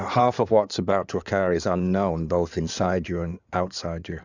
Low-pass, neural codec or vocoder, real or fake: 7.2 kHz; codec, 16 kHz in and 24 kHz out, 2.2 kbps, FireRedTTS-2 codec; fake